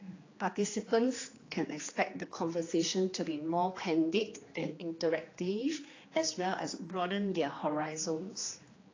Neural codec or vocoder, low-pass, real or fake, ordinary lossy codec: codec, 16 kHz, 2 kbps, X-Codec, HuBERT features, trained on general audio; 7.2 kHz; fake; AAC, 32 kbps